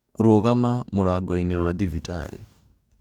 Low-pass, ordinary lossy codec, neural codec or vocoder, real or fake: 19.8 kHz; none; codec, 44.1 kHz, 2.6 kbps, DAC; fake